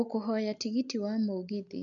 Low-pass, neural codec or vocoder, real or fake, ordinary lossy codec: 7.2 kHz; none; real; none